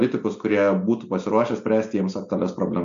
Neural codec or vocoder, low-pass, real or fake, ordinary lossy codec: none; 7.2 kHz; real; MP3, 64 kbps